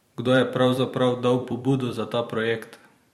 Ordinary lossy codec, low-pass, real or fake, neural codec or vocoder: MP3, 64 kbps; 19.8 kHz; fake; vocoder, 44.1 kHz, 128 mel bands every 256 samples, BigVGAN v2